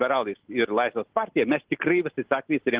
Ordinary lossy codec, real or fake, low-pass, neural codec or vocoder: Opus, 16 kbps; real; 3.6 kHz; none